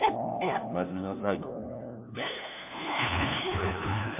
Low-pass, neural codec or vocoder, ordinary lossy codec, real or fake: 3.6 kHz; codec, 16 kHz, 1 kbps, FunCodec, trained on LibriTTS, 50 frames a second; MP3, 32 kbps; fake